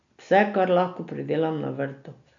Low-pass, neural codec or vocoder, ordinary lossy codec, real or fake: 7.2 kHz; none; none; real